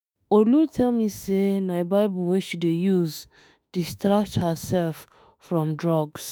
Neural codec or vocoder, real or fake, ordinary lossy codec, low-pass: autoencoder, 48 kHz, 32 numbers a frame, DAC-VAE, trained on Japanese speech; fake; none; none